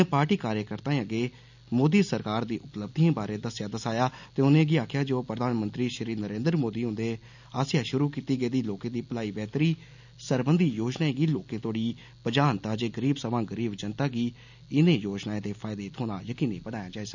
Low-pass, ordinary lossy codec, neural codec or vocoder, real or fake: 7.2 kHz; none; none; real